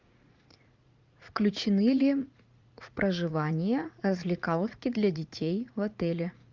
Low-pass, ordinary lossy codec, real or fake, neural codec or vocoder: 7.2 kHz; Opus, 24 kbps; fake; vocoder, 44.1 kHz, 80 mel bands, Vocos